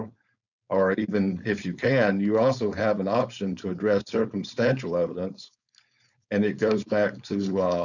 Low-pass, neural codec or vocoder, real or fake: 7.2 kHz; codec, 16 kHz, 4.8 kbps, FACodec; fake